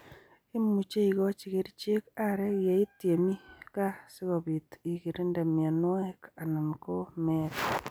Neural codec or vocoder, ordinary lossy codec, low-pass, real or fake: none; none; none; real